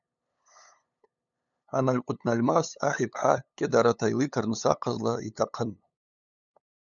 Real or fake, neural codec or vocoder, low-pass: fake; codec, 16 kHz, 8 kbps, FunCodec, trained on LibriTTS, 25 frames a second; 7.2 kHz